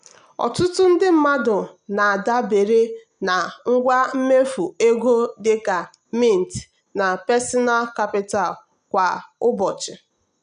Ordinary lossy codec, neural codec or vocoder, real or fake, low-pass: none; none; real; 9.9 kHz